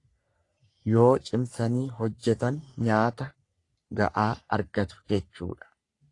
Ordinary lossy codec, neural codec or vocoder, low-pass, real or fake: AAC, 48 kbps; codec, 44.1 kHz, 3.4 kbps, Pupu-Codec; 10.8 kHz; fake